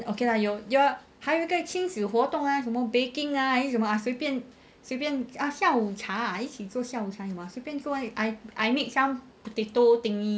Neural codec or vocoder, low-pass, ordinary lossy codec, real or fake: none; none; none; real